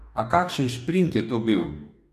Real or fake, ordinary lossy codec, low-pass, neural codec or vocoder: fake; none; 14.4 kHz; codec, 44.1 kHz, 2.6 kbps, DAC